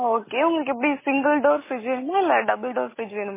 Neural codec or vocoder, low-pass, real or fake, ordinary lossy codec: none; 3.6 kHz; real; MP3, 16 kbps